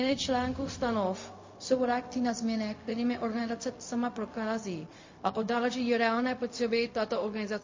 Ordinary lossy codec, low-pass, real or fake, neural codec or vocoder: MP3, 32 kbps; 7.2 kHz; fake; codec, 16 kHz, 0.4 kbps, LongCat-Audio-Codec